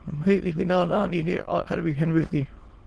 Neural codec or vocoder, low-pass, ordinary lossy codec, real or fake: autoencoder, 22.05 kHz, a latent of 192 numbers a frame, VITS, trained on many speakers; 9.9 kHz; Opus, 16 kbps; fake